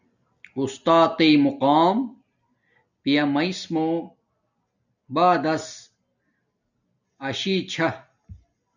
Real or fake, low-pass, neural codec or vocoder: real; 7.2 kHz; none